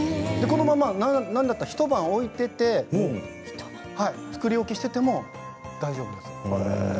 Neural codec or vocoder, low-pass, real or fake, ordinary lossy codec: none; none; real; none